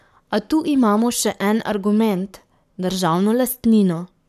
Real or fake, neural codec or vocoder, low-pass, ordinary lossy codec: fake; codec, 44.1 kHz, 7.8 kbps, Pupu-Codec; 14.4 kHz; none